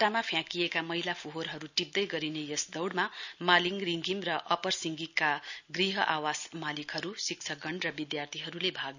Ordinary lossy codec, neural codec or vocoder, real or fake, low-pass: none; none; real; 7.2 kHz